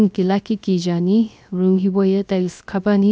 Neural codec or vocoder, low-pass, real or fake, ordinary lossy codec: codec, 16 kHz, 0.3 kbps, FocalCodec; none; fake; none